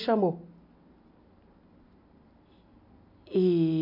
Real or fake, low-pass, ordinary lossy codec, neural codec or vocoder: real; 5.4 kHz; none; none